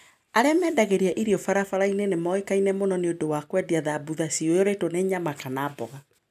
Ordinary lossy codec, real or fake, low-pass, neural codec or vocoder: none; real; 14.4 kHz; none